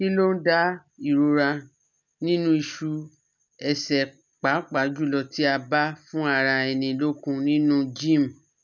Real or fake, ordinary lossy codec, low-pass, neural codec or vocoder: real; none; 7.2 kHz; none